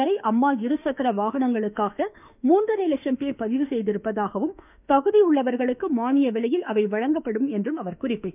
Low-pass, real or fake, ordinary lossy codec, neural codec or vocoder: 3.6 kHz; fake; none; autoencoder, 48 kHz, 32 numbers a frame, DAC-VAE, trained on Japanese speech